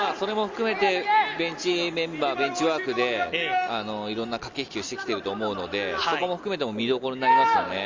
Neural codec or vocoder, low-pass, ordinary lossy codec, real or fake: none; 7.2 kHz; Opus, 32 kbps; real